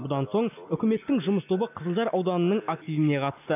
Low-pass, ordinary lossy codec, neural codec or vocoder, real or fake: 3.6 kHz; none; none; real